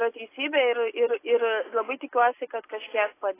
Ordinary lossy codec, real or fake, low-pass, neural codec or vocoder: AAC, 24 kbps; real; 3.6 kHz; none